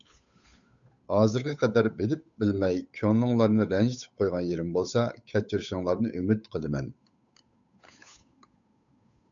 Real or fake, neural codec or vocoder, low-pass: fake; codec, 16 kHz, 8 kbps, FunCodec, trained on Chinese and English, 25 frames a second; 7.2 kHz